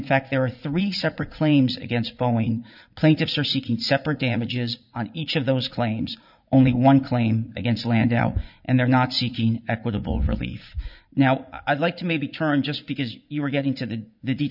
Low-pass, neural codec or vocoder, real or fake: 5.4 kHz; vocoder, 44.1 kHz, 80 mel bands, Vocos; fake